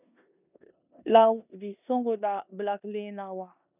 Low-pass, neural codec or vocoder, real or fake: 3.6 kHz; codec, 16 kHz in and 24 kHz out, 0.9 kbps, LongCat-Audio-Codec, four codebook decoder; fake